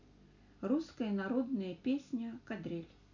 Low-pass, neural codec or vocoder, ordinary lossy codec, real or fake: 7.2 kHz; none; none; real